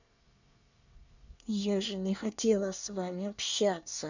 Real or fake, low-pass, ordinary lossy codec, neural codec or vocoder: fake; 7.2 kHz; none; codec, 24 kHz, 1 kbps, SNAC